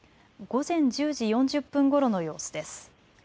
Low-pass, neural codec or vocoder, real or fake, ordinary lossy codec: none; none; real; none